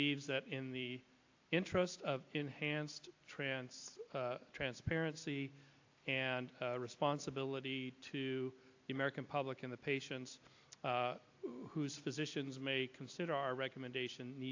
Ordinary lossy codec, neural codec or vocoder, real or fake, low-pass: AAC, 48 kbps; none; real; 7.2 kHz